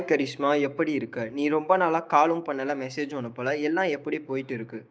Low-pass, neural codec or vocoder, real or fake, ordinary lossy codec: none; codec, 16 kHz, 6 kbps, DAC; fake; none